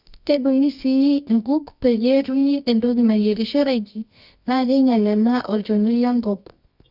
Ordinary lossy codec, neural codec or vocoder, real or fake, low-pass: Opus, 64 kbps; codec, 24 kHz, 0.9 kbps, WavTokenizer, medium music audio release; fake; 5.4 kHz